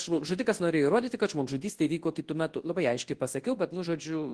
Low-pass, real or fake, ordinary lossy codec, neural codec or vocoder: 10.8 kHz; fake; Opus, 16 kbps; codec, 24 kHz, 0.9 kbps, WavTokenizer, large speech release